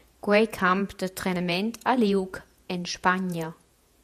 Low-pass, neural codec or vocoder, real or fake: 14.4 kHz; vocoder, 48 kHz, 128 mel bands, Vocos; fake